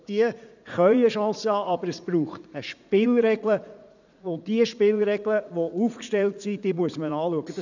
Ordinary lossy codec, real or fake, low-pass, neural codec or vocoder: none; fake; 7.2 kHz; vocoder, 44.1 kHz, 80 mel bands, Vocos